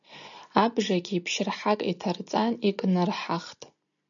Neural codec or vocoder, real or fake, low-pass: none; real; 7.2 kHz